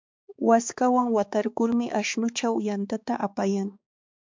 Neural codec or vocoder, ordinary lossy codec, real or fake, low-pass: codec, 16 kHz, 4 kbps, X-Codec, HuBERT features, trained on balanced general audio; MP3, 64 kbps; fake; 7.2 kHz